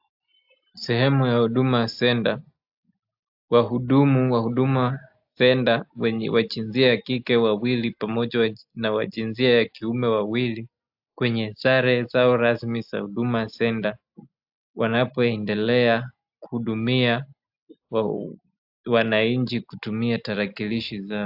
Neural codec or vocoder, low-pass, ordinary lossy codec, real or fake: none; 5.4 kHz; AAC, 48 kbps; real